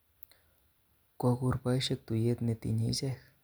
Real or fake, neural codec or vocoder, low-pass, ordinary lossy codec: real; none; none; none